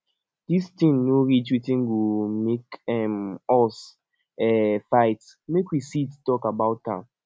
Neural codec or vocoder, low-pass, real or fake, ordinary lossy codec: none; none; real; none